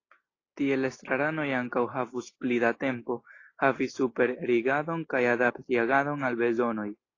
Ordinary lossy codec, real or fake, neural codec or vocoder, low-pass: AAC, 32 kbps; real; none; 7.2 kHz